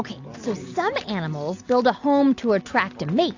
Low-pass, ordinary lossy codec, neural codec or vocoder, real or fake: 7.2 kHz; AAC, 48 kbps; none; real